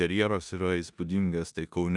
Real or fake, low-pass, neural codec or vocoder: fake; 10.8 kHz; codec, 16 kHz in and 24 kHz out, 0.9 kbps, LongCat-Audio-Codec, four codebook decoder